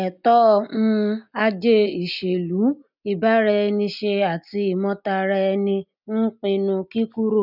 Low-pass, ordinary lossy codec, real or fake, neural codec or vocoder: 5.4 kHz; none; real; none